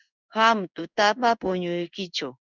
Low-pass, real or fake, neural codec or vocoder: 7.2 kHz; fake; codec, 16 kHz in and 24 kHz out, 1 kbps, XY-Tokenizer